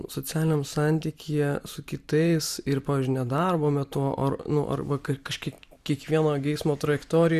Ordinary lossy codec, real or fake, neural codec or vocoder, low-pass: Opus, 64 kbps; fake; vocoder, 44.1 kHz, 128 mel bands every 512 samples, BigVGAN v2; 14.4 kHz